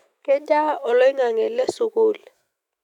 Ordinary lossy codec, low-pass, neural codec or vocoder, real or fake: none; 19.8 kHz; autoencoder, 48 kHz, 128 numbers a frame, DAC-VAE, trained on Japanese speech; fake